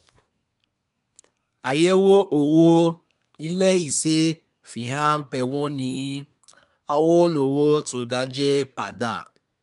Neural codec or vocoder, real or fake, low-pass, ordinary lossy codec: codec, 24 kHz, 1 kbps, SNAC; fake; 10.8 kHz; none